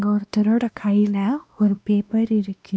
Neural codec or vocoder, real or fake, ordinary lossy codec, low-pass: codec, 16 kHz, 1 kbps, X-Codec, WavLM features, trained on Multilingual LibriSpeech; fake; none; none